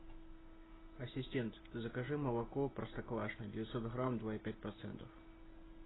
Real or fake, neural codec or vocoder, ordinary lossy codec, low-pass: real; none; AAC, 16 kbps; 7.2 kHz